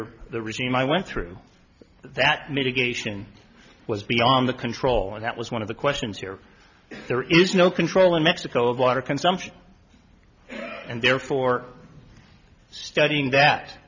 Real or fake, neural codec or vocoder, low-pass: real; none; 7.2 kHz